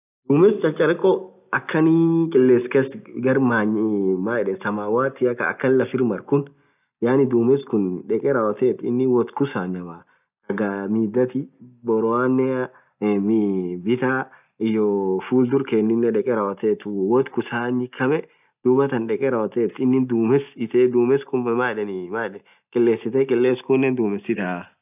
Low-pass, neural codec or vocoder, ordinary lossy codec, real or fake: 3.6 kHz; none; none; real